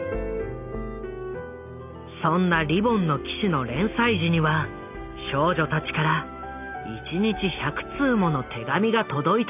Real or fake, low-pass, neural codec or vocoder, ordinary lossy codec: real; 3.6 kHz; none; none